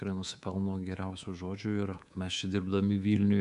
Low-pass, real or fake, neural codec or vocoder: 10.8 kHz; fake; codec, 24 kHz, 3.1 kbps, DualCodec